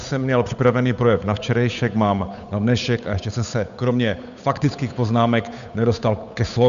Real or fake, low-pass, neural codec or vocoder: fake; 7.2 kHz; codec, 16 kHz, 8 kbps, FunCodec, trained on Chinese and English, 25 frames a second